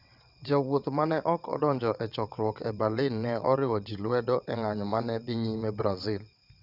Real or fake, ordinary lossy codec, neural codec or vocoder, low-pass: fake; none; vocoder, 22.05 kHz, 80 mel bands, WaveNeXt; 5.4 kHz